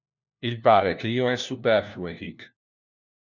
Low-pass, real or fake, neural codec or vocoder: 7.2 kHz; fake; codec, 16 kHz, 1 kbps, FunCodec, trained on LibriTTS, 50 frames a second